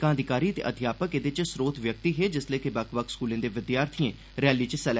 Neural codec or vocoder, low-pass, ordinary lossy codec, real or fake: none; none; none; real